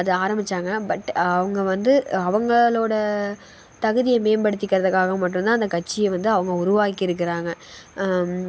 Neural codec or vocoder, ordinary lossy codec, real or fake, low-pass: none; none; real; none